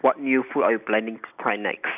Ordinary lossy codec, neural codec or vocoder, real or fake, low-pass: none; none; real; 3.6 kHz